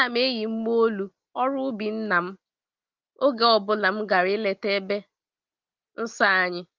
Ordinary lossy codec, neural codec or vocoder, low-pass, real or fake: Opus, 24 kbps; none; 7.2 kHz; real